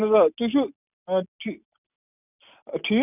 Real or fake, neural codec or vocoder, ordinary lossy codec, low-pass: real; none; none; 3.6 kHz